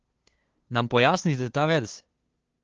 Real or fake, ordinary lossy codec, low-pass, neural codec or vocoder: fake; Opus, 16 kbps; 7.2 kHz; codec, 16 kHz, 2 kbps, FunCodec, trained on LibriTTS, 25 frames a second